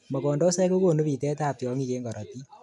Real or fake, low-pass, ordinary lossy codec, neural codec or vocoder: real; 10.8 kHz; none; none